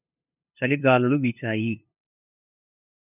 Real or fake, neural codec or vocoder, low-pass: fake; codec, 16 kHz, 2 kbps, FunCodec, trained on LibriTTS, 25 frames a second; 3.6 kHz